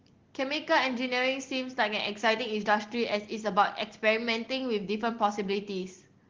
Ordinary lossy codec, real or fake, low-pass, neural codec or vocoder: Opus, 16 kbps; real; 7.2 kHz; none